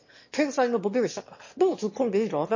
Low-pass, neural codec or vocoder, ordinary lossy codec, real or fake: 7.2 kHz; autoencoder, 22.05 kHz, a latent of 192 numbers a frame, VITS, trained on one speaker; MP3, 32 kbps; fake